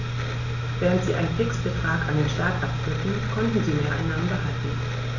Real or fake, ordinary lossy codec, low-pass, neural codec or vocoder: real; none; 7.2 kHz; none